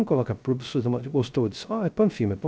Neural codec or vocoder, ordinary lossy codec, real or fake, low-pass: codec, 16 kHz, 0.3 kbps, FocalCodec; none; fake; none